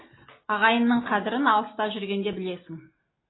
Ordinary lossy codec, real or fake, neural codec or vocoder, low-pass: AAC, 16 kbps; real; none; 7.2 kHz